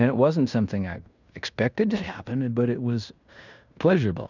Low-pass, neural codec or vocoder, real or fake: 7.2 kHz; codec, 16 kHz in and 24 kHz out, 0.9 kbps, LongCat-Audio-Codec, fine tuned four codebook decoder; fake